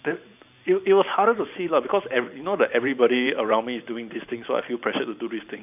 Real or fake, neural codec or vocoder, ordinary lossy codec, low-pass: fake; vocoder, 44.1 kHz, 128 mel bands every 512 samples, BigVGAN v2; none; 3.6 kHz